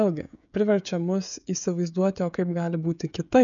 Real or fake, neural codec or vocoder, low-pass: fake; codec, 16 kHz, 16 kbps, FreqCodec, smaller model; 7.2 kHz